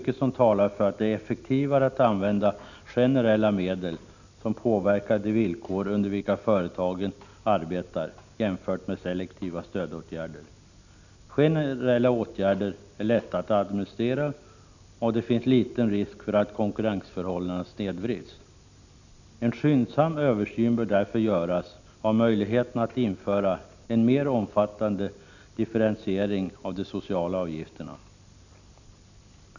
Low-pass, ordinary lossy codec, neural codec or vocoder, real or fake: 7.2 kHz; none; none; real